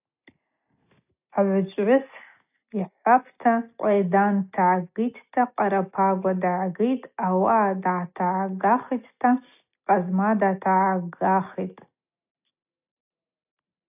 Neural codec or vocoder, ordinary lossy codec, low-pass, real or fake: none; AAC, 32 kbps; 3.6 kHz; real